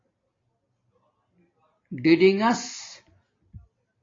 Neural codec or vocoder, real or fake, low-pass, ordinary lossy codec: none; real; 7.2 kHz; AAC, 32 kbps